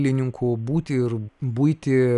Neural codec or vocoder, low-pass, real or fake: none; 10.8 kHz; real